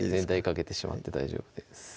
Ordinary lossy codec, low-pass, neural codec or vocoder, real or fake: none; none; none; real